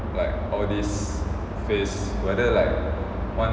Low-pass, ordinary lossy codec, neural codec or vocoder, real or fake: none; none; none; real